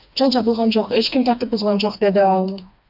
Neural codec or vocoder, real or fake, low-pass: codec, 16 kHz, 2 kbps, FreqCodec, smaller model; fake; 5.4 kHz